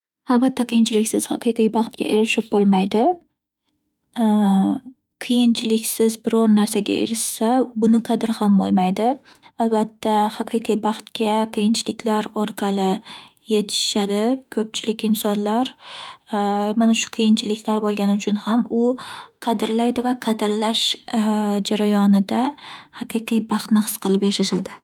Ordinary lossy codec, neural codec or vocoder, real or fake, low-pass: none; autoencoder, 48 kHz, 32 numbers a frame, DAC-VAE, trained on Japanese speech; fake; 19.8 kHz